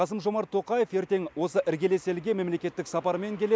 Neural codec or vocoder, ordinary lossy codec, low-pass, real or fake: none; none; none; real